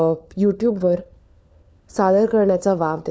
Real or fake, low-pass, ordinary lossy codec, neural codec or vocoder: fake; none; none; codec, 16 kHz, 4 kbps, FunCodec, trained on LibriTTS, 50 frames a second